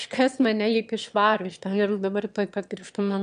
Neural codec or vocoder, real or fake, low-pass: autoencoder, 22.05 kHz, a latent of 192 numbers a frame, VITS, trained on one speaker; fake; 9.9 kHz